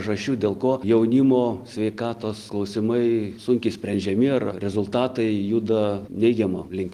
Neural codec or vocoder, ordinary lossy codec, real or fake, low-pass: none; Opus, 24 kbps; real; 14.4 kHz